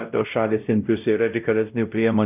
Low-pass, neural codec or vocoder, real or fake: 3.6 kHz; codec, 16 kHz, 0.5 kbps, X-Codec, WavLM features, trained on Multilingual LibriSpeech; fake